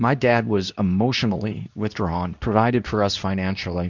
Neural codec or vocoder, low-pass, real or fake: codec, 24 kHz, 0.9 kbps, WavTokenizer, medium speech release version 2; 7.2 kHz; fake